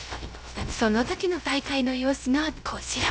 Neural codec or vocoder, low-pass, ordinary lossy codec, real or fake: codec, 16 kHz, 0.3 kbps, FocalCodec; none; none; fake